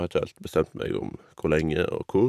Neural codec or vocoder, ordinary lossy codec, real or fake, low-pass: vocoder, 44.1 kHz, 128 mel bands every 512 samples, BigVGAN v2; none; fake; 14.4 kHz